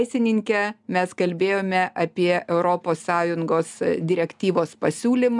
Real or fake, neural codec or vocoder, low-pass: real; none; 10.8 kHz